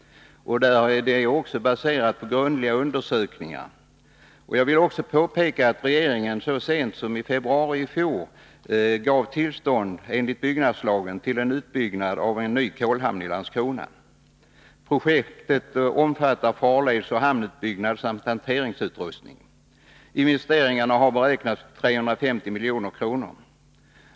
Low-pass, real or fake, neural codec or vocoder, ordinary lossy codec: none; real; none; none